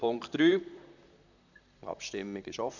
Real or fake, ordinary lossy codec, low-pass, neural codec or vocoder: fake; none; 7.2 kHz; vocoder, 44.1 kHz, 128 mel bands, Pupu-Vocoder